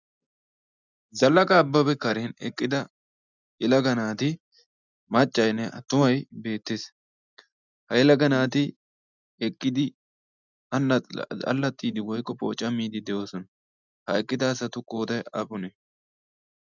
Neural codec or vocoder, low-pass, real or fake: none; 7.2 kHz; real